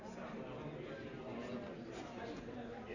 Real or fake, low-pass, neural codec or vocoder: real; 7.2 kHz; none